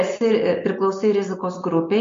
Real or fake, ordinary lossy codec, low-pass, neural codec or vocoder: real; MP3, 48 kbps; 7.2 kHz; none